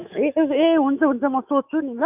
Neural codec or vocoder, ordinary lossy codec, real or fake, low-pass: autoencoder, 48 kHz, 128 numbers a frame, DAC-VAE, trained on Japanese speech; none; fake; 3.6 kHz